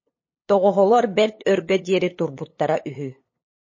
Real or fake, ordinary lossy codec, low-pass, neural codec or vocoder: fake; MP3, 32 kbps; 7.2 kHz; codec, 16 kHz, 8 kbps, FunCodec, trained on LibriTTS, 25 frames a second